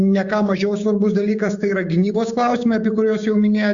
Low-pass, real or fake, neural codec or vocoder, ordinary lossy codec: 7.2 kHz; fake; codec, 16 kHz, 6 kbps, DAC; Opus, 64 kbps